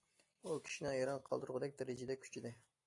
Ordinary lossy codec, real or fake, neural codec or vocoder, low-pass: AAC, 48 kbps; real; none; 10.8 kHz